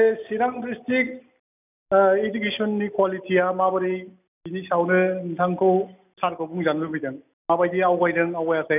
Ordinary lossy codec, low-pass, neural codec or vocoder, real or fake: none; 3.6 kHz; none; real